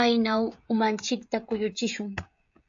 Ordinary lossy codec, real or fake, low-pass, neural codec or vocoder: AAC, 64 kbps; fake; 7.2 kHz; codec, 16 kHz, 16 kbps, FreqCodec, smaller model